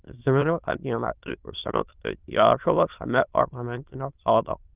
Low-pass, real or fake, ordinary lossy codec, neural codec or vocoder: 3.6 kHz; fake; Opus, 32 kbps; autoencoder, 22.05 kHz, a latent of 192 numbers a frame, VITS, trained on many speakers